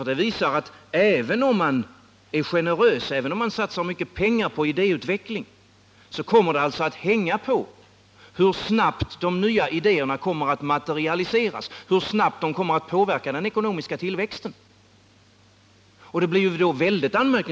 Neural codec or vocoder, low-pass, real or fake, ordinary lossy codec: none; none; real; none